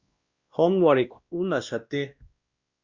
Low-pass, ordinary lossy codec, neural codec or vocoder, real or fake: 7.2 kHz; Opus, 64 kbps; codec, 16 kHz, 1 kbps, X-Codec, WavLM features, trained on Multilingual LibriSpeech; fake